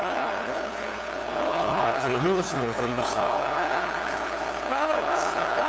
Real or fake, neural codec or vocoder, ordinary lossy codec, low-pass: fake; codec, 16 kHz, 2 kbps, FunCodec, trained on LibriTTS, 25 frames a second; none; none